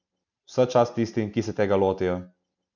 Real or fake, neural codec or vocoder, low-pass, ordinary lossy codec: real; none; 7.2 kHz; none